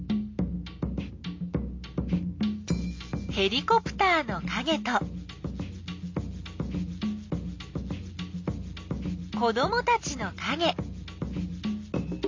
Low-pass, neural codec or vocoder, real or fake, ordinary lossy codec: 7.2 kHz; none; real; MP3, 48 kbps